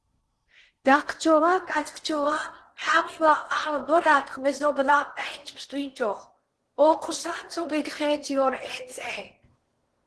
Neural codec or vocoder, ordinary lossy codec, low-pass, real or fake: codec, 16 kHz in and 24 kHz out, 0.8 kbps, FocalCodec, streaming, 65536 codes; Opus, 16 kbps; 10.8 kHz; fake